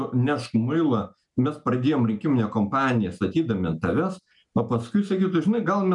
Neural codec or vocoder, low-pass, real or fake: none; 10.8 kHz; real